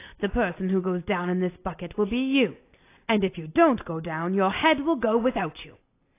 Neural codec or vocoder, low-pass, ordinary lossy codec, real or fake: none; 3.6 kHz; AAC, 24 kbps; real